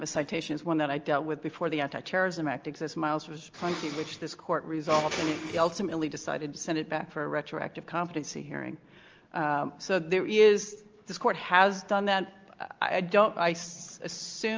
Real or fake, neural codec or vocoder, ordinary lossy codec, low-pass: real; none; Opus, 24 kbps; 7.2 kHz